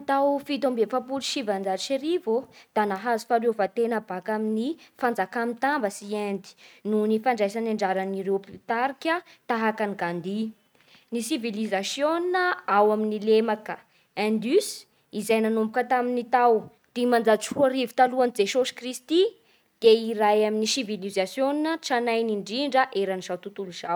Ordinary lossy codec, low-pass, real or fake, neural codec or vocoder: none; none; real; none